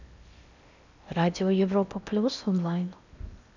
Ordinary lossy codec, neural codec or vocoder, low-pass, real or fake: none; codec, 16 kHz in and 24 kHz out, 0.8 kbps, FocalCodec, streaming, 65536 codes; 7.2 kHz; fake